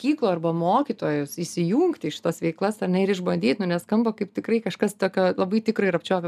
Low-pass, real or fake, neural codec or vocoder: 14.4 kHz; real; none